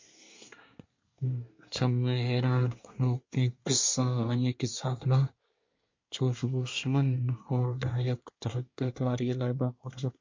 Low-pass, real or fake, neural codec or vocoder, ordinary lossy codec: 7.2 kHz; fake; codec, 24 kHz, 1 kbps, SNAC; MP3, 48 kbps